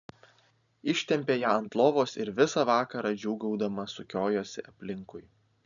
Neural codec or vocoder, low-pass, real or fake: none; 7.2 kHz; real